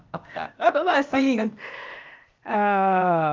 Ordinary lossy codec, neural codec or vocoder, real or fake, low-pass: Opus, 24 kbps; codec, 16 kHz, 0.8 kbps, ZipCodec; fake; 7.2 kHz